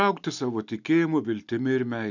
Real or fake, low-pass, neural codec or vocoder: real; 7.2 kHz; none